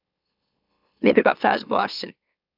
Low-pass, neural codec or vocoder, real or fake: 5.4 kHz; autoencoder, 44.1 kHz, a latent of 192 numbers a frame, MeloTTS; fake